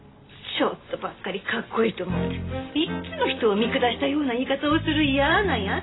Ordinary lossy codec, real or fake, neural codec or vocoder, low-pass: AAC, 16 kbps; real; none; 7.2 kHz